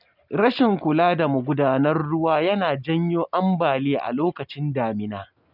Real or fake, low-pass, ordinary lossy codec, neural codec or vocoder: real; 5.4 kHz; none; none